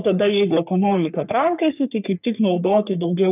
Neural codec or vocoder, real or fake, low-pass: codec, 44.1 kHz, 3.4 kbps, Pupu-Codec; fake; 3.6 kHz